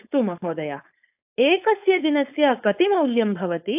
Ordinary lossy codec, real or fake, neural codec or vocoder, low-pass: AAC, 32 kbps; fake; codec, 16 kHz, 4.8 kbps, FACodec; 3.6 kHz